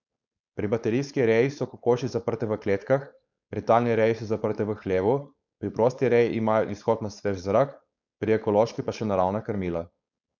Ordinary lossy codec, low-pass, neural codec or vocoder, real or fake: none; 7.2 kHz; codec, 16 kHz, 4.8 kbps, FACodec; fake